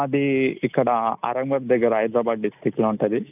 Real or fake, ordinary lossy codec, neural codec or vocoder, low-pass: real; none; none; 3.6 kHz